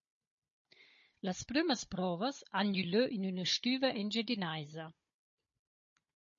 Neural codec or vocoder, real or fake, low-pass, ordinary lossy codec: codec, 16 kHz, 16 kbps, FunCodec, trained on Chinese and English, 50 frames a second; fake; 7.2 kHz; MP3, 32 kbps